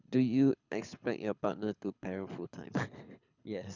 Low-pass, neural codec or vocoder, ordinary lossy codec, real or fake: 7.2 kHz; codec, 24 kHz, 6 kbps, HILCodec; none; fake